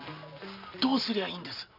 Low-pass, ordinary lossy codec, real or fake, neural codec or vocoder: 5.4 kHz; none; real; none